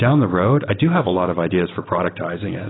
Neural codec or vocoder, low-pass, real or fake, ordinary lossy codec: none; 7.2 kHz; real; AAC, 16 kbps